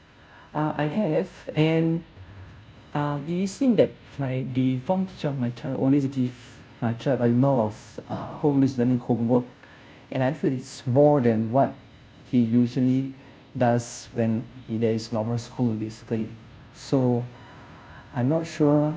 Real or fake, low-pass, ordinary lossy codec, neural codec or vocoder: fake; none; none; codec, 16 kHz, 0.5 kbps, FunCodec, trained on Chinese and English, 25 frames a second